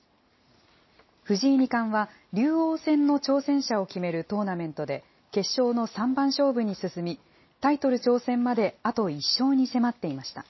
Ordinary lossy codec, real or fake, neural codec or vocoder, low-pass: MP3, 24 kbps; real; none; 7.2 kHz